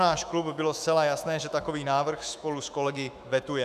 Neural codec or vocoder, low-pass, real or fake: autoencoder, 48 kHz, 128 numbers a frame, DAC-VAE, trained on Japanese speech; 14.4 kHz; fake